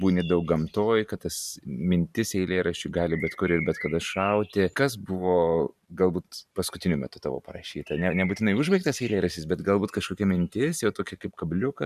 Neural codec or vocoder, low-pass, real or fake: vocoder, 44.1 kHz, 128 mel bands every 512 samples, BigVGAN v2; 14.4 kHz; fake